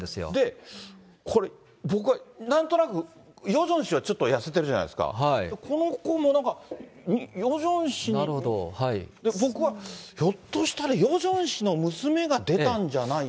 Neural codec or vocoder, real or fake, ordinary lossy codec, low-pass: none; real; none; none